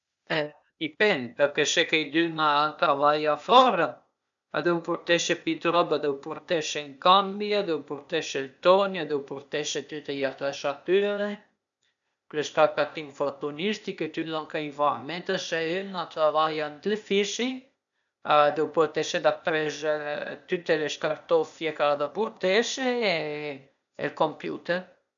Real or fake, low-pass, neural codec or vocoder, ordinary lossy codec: fake; 7.2 kHz; codec, 16 kHz, 0.8 kbps, ZipCodec; none